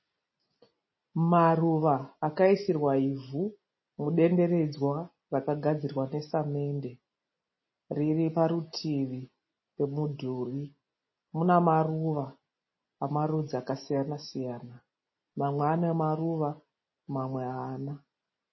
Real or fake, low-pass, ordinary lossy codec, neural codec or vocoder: real; 7.2 kHz; MP3, 24 kbps; none